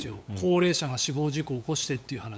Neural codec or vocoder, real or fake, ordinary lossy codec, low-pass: codec, 16 kHz, 8 kbps, FunCodec, trained on LibriTTS, 25 frames a second; fake; none; none